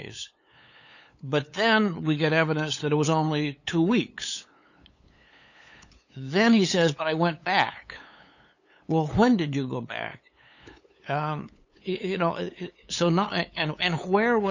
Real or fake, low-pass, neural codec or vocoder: fake; 7.2 kHz; codec, 16 kHz, 8 kbps, FunCodec, trained on LibriTTS, 25 frames a second